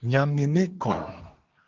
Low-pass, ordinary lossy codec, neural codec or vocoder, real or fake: 7.2 kHz; Opus, 16 kbps; codec, 24 kHz, 1 kbps, SNAC; fake